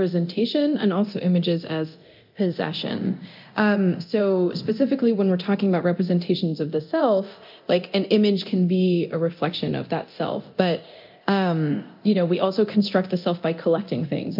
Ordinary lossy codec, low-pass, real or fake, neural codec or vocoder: MP3, 48 kbps; 5.4 kHz; fake; codec, 24 kHz, 0.9 kbps, DualCodec